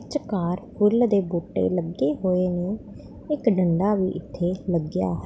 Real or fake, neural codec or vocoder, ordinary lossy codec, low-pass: real; none; none; none